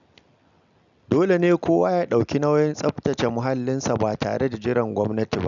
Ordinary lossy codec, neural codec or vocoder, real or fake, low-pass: none; none; real; 7.2 kHz